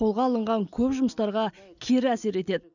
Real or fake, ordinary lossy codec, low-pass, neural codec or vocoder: real; none; 7.2 kHz; none